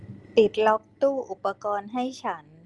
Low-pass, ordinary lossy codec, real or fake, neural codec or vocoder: 10.8 kHz; Opus, 24 kbps; real; none